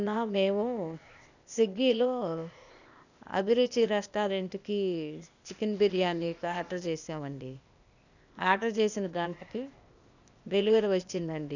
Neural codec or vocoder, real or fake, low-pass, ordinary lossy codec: codec, 16 kHz, 0.8 kbps, ZipCodec; fake; 7.2 kHz; none